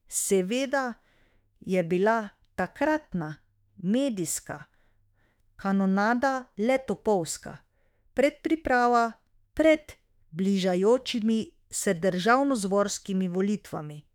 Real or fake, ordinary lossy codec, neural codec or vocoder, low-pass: fake; none; autoencoder, 48 kHz, 32 numbers a frame, DAC-VAE, trained on Japanese speech; 19.8 kHz